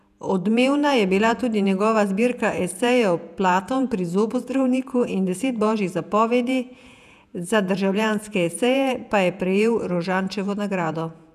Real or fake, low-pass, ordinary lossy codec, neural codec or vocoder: fake; 14.4 kHz; none; vocoder, 48 kHz, 128 mel bands, Vocos